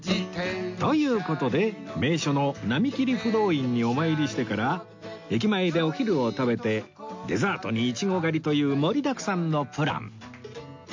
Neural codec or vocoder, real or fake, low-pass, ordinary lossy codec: none; real; 7.2 kHz; MP3, 48 kbps